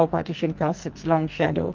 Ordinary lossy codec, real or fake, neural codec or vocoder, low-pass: Opus, 24 kbps; fake; codec, 44.1 kHz, 2.6 kbps, SNAC; 7.2 kHz